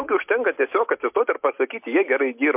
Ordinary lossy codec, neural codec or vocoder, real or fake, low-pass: MP3, 32 kbps; none; real; 3.6 kHz